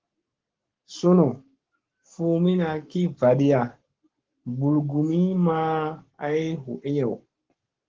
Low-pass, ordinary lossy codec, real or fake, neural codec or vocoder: 7.2 kHz; Opus, 16 kbps; fake; codec, 44.1 kHz, 7.8 kbps, Pupu-Codec